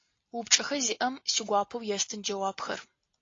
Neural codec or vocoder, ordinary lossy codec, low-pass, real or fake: none; AAC, 32 kbps; 7.2 kHz; real